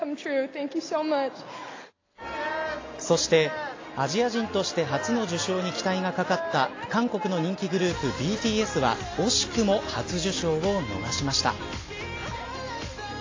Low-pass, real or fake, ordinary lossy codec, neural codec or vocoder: 7.2 kHz; real; AAC, 32 kbps; none